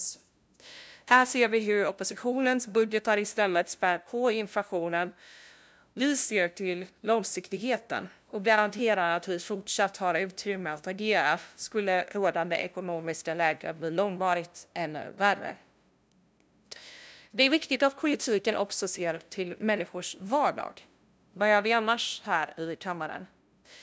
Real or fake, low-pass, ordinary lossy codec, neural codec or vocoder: fake; none; none; codec, 16 kHz, 0.5 kbps, FunCodec, trained on LibriTTS, 25 frames a second